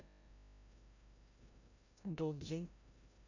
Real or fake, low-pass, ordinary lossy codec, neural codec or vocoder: fake; 7.2 kHz; none; codec, 16 kHz, 0.5 kbps, FreqCodec, larger model